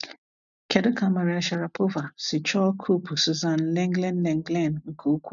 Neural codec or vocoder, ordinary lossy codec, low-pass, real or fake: none; none; 7.2 kHz; real